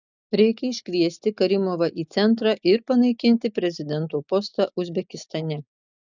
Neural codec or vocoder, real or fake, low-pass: none; real; 7.2 kHz